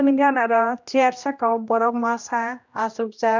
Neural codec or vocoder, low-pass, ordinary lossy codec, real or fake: codec, 16 kHz, 1 kbps, X-Codec, HuBERT features, trained on general audio; 7.2 kHz; none; fake